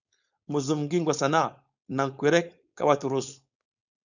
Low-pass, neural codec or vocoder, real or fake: 7.2 kHz; codec, 16 kHz, 4.8 kbps, FACodec; fake